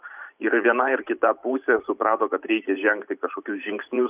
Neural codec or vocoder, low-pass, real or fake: vocoder, 44.1 kHz, 128 mel bands every 512 samples, BigVGAN v2; 3.6 kHz; fake